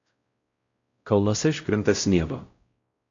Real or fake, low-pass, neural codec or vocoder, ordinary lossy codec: fake; 7.2 kHz; codec, 16 kHz, 0.5 kbps, X-Codec, WavLM features, trained on Multilingual LibriSpeech; AAC, 48 kbps